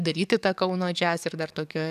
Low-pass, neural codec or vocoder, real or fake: 14.4 kHz; codec, 44.1 kHz, 7.8 kbps, DAC; fake